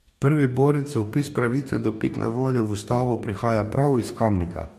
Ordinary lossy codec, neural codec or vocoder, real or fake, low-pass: MP3, 64 kbps; codec, 44.1 kHz, 2.6 kbps, DAC; fake; 14.4 kHz